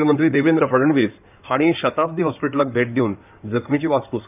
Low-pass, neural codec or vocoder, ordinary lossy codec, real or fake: 3.6 kHz; codec, 16 kHz in and 24 kHz out, 2.2 kbps, FireRedTTS-2 codec; none; fake